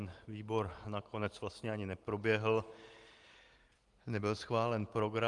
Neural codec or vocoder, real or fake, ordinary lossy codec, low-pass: none; real; Opus, 24 kbps; 10.8 kHz